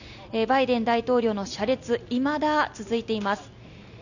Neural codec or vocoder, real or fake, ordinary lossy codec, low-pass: none; real; none; 7.2 kHz